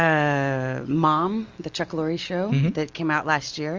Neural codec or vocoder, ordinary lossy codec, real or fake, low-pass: none; Opus, 32 kbps; real; 7.2 kHz